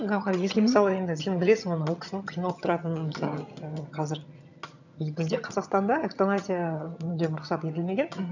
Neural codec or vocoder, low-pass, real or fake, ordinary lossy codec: vocoder, 22.05 kHz, 80 mel bands, HiFi-GAN; 7.2 kHz; fake; none